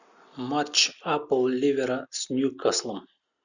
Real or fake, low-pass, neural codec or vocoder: real; 7.2 kHz; none